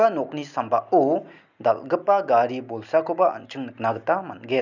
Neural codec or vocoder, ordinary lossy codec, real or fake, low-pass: none; none; real; 7.2 kHz